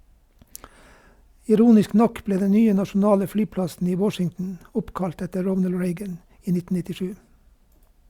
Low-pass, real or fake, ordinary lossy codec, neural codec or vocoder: 19.8 kHz; real; Opus, 64 kbps; none